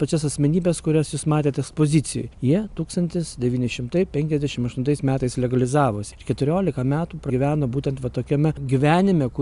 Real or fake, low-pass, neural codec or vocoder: real; 10.8 kHz; none